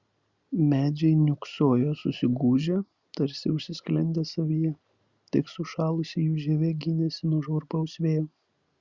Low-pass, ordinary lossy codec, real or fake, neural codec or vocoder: 7.2 kHz; Opus, 64 kbps; real; none